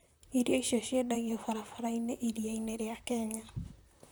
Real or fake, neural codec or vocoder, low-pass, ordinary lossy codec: fake; vocoder, 44.1 kHz, 128 mel bands, Pupu-Vocoder; none; none